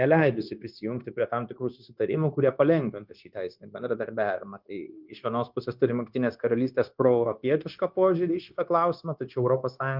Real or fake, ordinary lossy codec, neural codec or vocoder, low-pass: fake; Opus, 32 kbps; codec, 16 kHz, 0.9 kbps, LongCat-Audio-Codec; 5.4 kHz